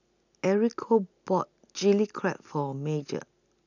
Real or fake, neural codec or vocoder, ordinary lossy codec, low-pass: real; none; none; 7.2 kHz